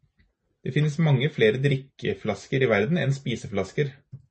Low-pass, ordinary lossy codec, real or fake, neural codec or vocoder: 9.9 kHz; MP3, 32 kbps; real; none